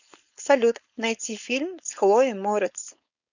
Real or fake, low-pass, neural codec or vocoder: fake; 7.2 kHz; codec, 16 kHz, 4.8 kbps, FACodec